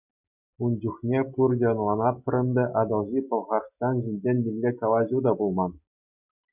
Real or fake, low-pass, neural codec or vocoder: real; 3.6 kHz; none